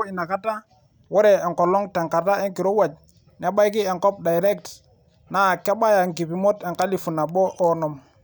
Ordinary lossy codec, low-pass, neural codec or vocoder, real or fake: none; none; none; real